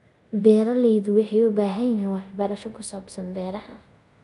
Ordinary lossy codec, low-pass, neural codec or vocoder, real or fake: none; 10.8 kHz; codec, 24 kHz, 0.5 kbps, DualCodec; fake